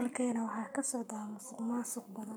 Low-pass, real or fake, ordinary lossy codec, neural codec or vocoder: none; fake; none; codec, 44.1 kHz, 7.8 kbps, Pupu-Codec